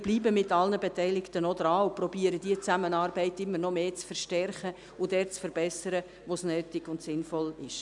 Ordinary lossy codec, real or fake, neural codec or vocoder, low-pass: none; real; none; 10.8 kHz